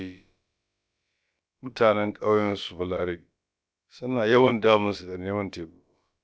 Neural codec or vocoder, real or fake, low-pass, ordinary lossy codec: codec, 16 kHz, about 1 kbps, DyCAST, with the encoder's durations; fake; none; none